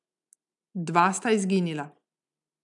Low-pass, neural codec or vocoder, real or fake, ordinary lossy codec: 10.8 kHz; none; real; none